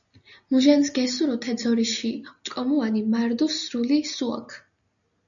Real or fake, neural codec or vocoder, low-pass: real; none; 7.2 kHz